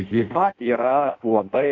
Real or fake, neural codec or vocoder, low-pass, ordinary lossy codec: fake; codec, 16 kHz in and 24 kHz out, 0.6 kbps, FireRedTTS-2 codec; 7.2 kHz; AAC, 48 kbps